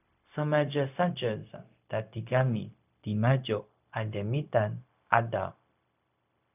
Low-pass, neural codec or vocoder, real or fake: 3.6 kHz; codec, 16 kHz, 0.4 kbps, LongCat-Audio-Codec; fake